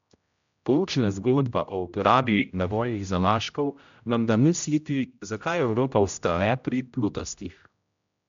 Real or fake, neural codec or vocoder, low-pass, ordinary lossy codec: fake; codec, 16 kHz, 0.5 kbps, X-Codec, HuBERT features, trained on general audio; 7.2 kHz; MP3, 64 kbps